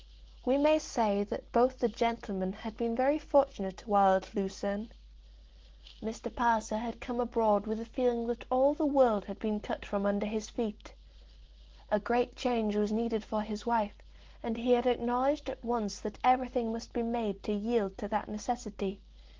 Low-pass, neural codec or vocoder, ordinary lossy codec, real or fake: 7.2 kHz; none; Opus, 16 kbps; real